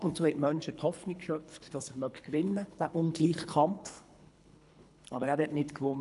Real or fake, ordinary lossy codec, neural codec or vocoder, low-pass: fake; none; codec, 24 kHz, 3 kbps, HILCodec; 10.8 kHz